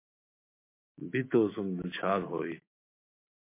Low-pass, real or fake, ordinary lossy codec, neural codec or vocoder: 3.6 kHz; fake; MP3, 24 kbps; autoencoder, 48 kHz, 128 numbers a frame, DAC-VAE, trained on Japanese speech